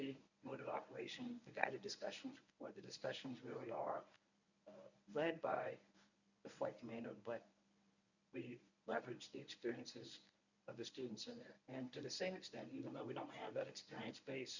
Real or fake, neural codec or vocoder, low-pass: fake; codec, 24 kHz, 0.9 kbps, WavTokenizer, medium speech release version 1; 7.2 kHz